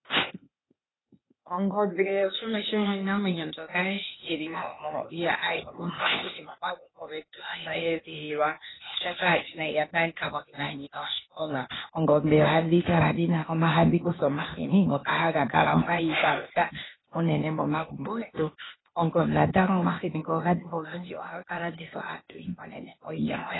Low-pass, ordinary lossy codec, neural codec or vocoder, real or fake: 7.2 kHz; AAC, 16 kbps; codec, 16 kHz, 0.8 kbps, ZipCodec; fake